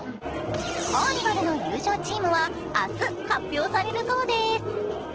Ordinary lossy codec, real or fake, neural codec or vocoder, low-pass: Opus, 16 kbps; real; none; 7.2 kHz